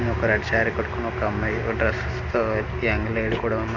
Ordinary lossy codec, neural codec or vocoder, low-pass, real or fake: none; none; 7.2 kHz; real